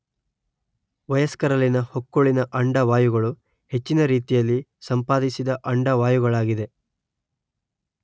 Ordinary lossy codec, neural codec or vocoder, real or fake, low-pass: none; none; real; none